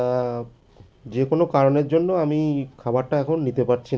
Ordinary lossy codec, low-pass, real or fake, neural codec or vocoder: none; none; real; none